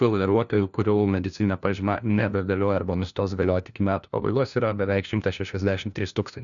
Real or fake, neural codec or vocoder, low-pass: fake; codec, 16 kHz, 1 kbps, FunCodec, trained on LibriTTS, 50 frames a second; 7.2 kHz